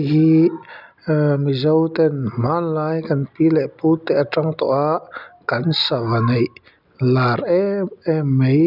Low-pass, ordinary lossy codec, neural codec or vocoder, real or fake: 5.4 kHz; none; none; real